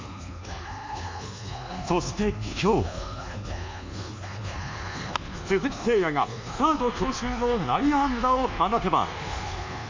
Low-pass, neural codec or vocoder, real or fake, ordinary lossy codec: 7.2 kHz; codec, 24 kHz, 1.2 kbps, DualCodec; fake; MP3, 64 kbps